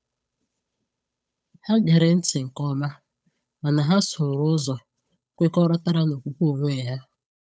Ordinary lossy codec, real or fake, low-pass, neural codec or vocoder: none; fake; none; codec, 16 kHz, 8 kbps, FunCodec, trained on Chinese and English, 25 frames a second